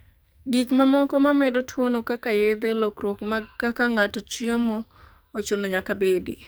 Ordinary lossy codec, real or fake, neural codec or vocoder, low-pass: none; fake; codec, 44.1 kHz, 2.6 kbps, SNAC; none